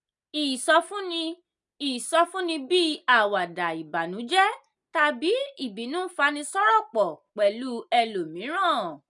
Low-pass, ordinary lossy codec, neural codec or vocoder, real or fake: 10.8 kHz; none; none; real